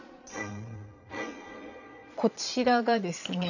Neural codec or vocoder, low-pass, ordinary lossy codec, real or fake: vocoder, 22.05 kHz, 80 mel bands, Vocos; 7.2 kHz; none; fake